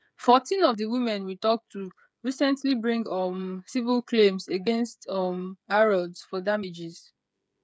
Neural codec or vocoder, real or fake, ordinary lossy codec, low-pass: codec, 16 kHz, 8 kbps, FreqCodec, smaller model; fake; none; none